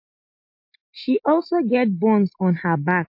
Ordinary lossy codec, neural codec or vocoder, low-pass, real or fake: MP3, 32 kbps; none; 5.4 kHz; real